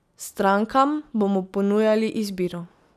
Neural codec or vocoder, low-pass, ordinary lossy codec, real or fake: none; 14.4 kHz; none; real